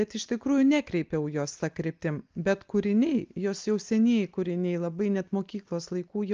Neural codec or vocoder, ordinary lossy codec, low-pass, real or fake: none; Opus, 24 kbps; 7.2 kHz; real